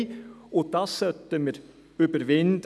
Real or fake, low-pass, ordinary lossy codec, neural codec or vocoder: real; none; none; none